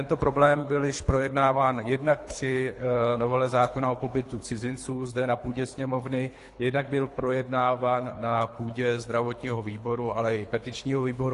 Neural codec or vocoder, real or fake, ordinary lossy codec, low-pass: codec, 24 kHz, 3 kbps, HILCodec; fake; AAC, 48 kbps; 10.8 kHz